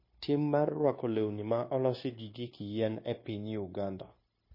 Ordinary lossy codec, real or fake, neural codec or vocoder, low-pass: MP3, 24 kbps; fake; codec, 16 kHz, 0.9 kbps, LongCat-Audio-Codec; 5.4 kHz